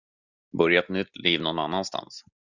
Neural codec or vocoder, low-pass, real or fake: none; 7.2 kHz; real